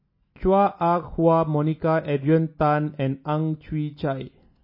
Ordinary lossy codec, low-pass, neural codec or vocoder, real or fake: MP3, 24 kbps; 5.4 kHz; none; real